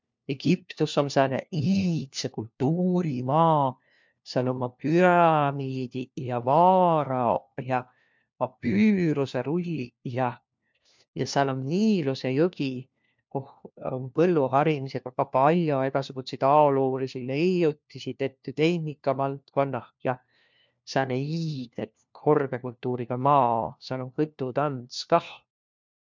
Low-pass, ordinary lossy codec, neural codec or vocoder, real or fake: 7.2 kHz; MP3, 64 kbps; codec, 16 kHz, 1 kbps, FunCodec, trained on LibriTTS, 50 frames a second; fake